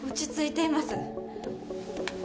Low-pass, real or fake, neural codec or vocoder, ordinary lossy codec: none; real; none; none